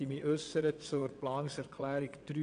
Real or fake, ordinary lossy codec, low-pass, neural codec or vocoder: fake; none; 9.9 kHz; vocoder, 22.05 kHz, 80 mel bands, WaveNeXt